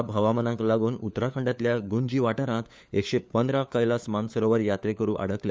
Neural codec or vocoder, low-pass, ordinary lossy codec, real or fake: codec, 16 kHz, 4 kbps, FunCodec, trained on LibriTTS, 50 frames a second; none; none; fake